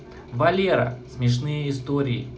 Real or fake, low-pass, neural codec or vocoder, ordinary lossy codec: real; none; none; none